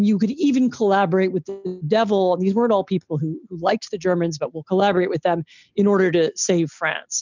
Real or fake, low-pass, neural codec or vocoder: real; 7.2 kHz; none